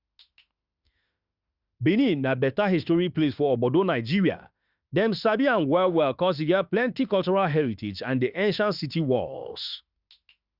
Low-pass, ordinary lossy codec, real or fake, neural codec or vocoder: 5.4 kHz; Opus, 64 kbps; fake; autoencoder, 48 kHz, 32 numbers a frame, DAC-VAE, trained on Japanese speech